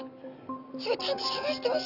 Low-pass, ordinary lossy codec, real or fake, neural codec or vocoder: 5.4 kHz; none; real; none